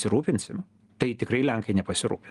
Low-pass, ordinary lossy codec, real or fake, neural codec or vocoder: 10.8 kHz; Opus, 24 kbps; real; none